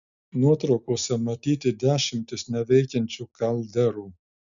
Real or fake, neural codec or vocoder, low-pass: real; none; 7.2 kHz